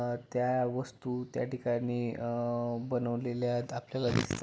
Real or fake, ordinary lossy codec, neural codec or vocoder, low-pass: real; none; none; none